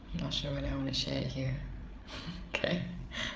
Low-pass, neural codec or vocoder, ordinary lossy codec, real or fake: none; codec, 16 kHz, 8 kbps, FreqCodec, larger model; none; fake